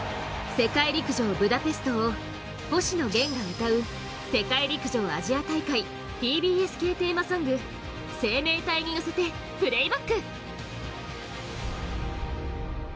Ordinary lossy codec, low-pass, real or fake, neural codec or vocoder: none; none; real; none